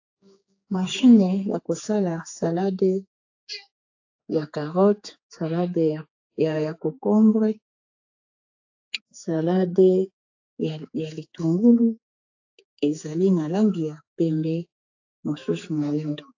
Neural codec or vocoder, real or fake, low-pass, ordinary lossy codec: codec, 16 kHz, 4 kbps, X-Codec, HuBERT features, trained on general audio; fake; 7.2 kHz; AAC, 48 kbps